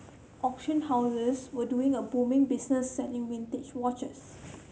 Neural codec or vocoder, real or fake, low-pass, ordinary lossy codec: none; real; none; none